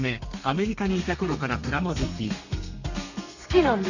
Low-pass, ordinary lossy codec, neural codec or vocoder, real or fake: 7.2 kHz; none; codec, 32 kHz, 1.9 kbps, SNAC; fake